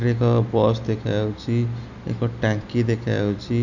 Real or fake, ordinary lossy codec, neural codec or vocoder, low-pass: real; none; none; 7.2 kHz